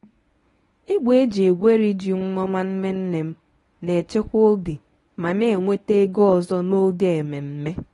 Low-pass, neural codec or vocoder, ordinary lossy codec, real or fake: 10.8 kHz; codec, 24 kHz, 0.9 kbps, WavTokenizer, medium speech release version 1; AAC, 32 kbps; fake